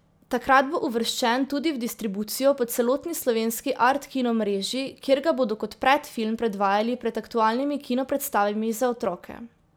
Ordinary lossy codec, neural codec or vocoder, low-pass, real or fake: none; none; none; real